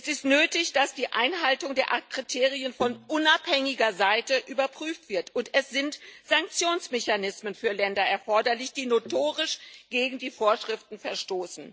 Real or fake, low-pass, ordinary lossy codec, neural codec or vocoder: real; none; none; none